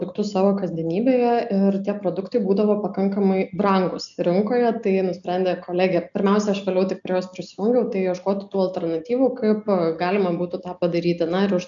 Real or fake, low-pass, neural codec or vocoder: real; 7.2 kHz; none